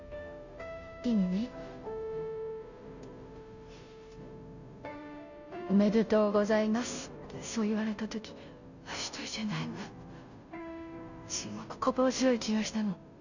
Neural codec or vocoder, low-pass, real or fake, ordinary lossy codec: codec, 16 kHz, 0.5 kbps, FunCodec, trained on Chinese and English, 25 frames a second; 7.2 kHz; fake; none